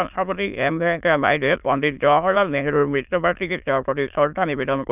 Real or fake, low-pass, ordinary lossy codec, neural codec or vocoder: fake; 3.6 kHz; none; autoencoder, 22.05 kHz, a latent of 192 numbers a frame, VITS, trained on many speakers